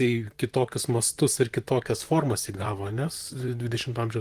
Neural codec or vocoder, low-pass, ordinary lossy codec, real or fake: vocoder, 44.1 kHz, 128 mel bands, Pupu-Vocoder; 14.4 kHz; Opus, 24 kbps; fake